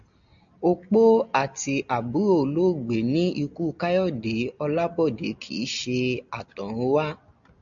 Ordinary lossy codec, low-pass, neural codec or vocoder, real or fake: MP3, 96 kbps; 7.2 kHz; none; real